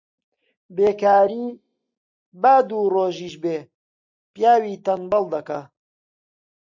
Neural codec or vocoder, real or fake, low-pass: none; real; 7.2 kHz